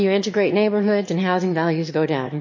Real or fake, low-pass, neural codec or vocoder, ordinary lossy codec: fake; 7.2 kHz; autoencoder, 22.05 kHz, a latent of 192 numbers a frame, VITS, trained on one speaker; MP3, 32 kbps